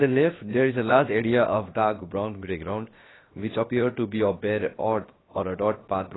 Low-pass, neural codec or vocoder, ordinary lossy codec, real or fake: 7.2 kHz; codec, 16 kHz, about 1 kbps, DyCAST, with the encoder's durations; AAC, 16 kbps; fake